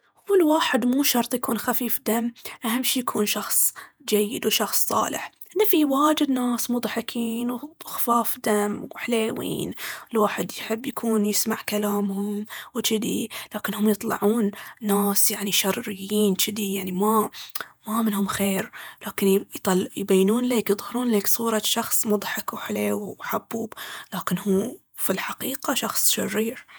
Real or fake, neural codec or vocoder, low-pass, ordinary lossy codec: fake; vocoder, 48 kHz, 128 mel bands, Vocos; none; none